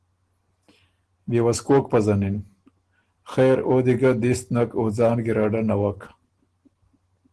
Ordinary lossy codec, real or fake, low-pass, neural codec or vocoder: Opus, 16 kbps; real; 10.8 kHz; none